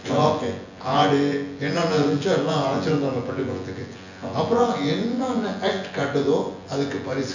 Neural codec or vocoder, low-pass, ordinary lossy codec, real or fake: vocoder, 24 kHz, 100 mel bands, Vocos; 7.2 kHz; none; fake